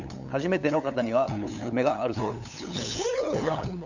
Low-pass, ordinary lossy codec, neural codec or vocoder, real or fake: 7.2 kHz; none; codec, 16 kHz, 8 kbps, FunCodec, trained on LibriTTS, 25 frames a second; fake